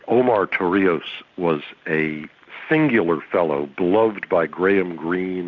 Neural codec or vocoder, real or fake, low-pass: none; real; 7.2 kHz